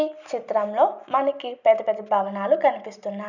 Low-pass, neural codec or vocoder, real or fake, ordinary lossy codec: 7.2 kHz; none; real; none